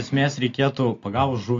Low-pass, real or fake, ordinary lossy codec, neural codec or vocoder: 7.2 kHz; real; AAC, 48 kbps; none